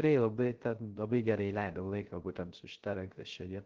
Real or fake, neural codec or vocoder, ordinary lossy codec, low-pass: fake; codec, 16 kHz, 0.3 kbps, FocalCodec; Opus, 16 kbps; 7.2 kHz